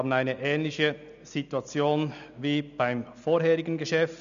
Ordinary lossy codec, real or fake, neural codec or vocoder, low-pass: none; real; none; 7.2 kHz